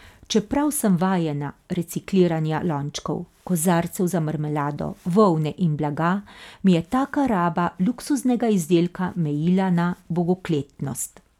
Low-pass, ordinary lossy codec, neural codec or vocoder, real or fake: 19.8 kHz; none; none; real